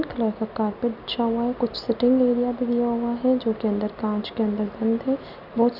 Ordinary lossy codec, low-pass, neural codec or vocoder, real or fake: none; 5.4 kHz; none; real